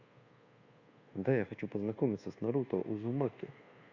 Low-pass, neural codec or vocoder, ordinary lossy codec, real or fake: 7.2 kHz; codec, 24 kHz, 1.2 kbps, DualCodec; Opus, 64 kbps; fake